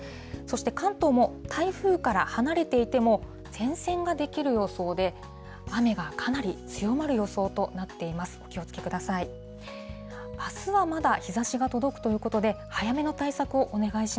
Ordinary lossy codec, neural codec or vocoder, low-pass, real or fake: none; none; none; real